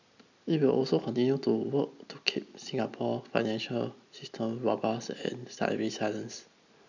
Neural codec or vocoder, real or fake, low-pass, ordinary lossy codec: none; real; 7.2 kHz; none